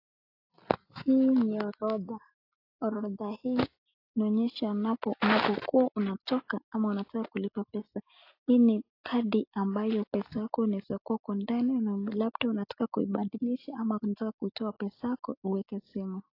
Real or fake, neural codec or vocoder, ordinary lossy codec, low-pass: real; none; AAC, 32 kbps; 5.4 kHz